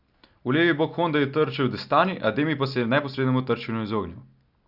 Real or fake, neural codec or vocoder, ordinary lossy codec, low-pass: real; none; Opus, 64 kbps; 5.4 kHz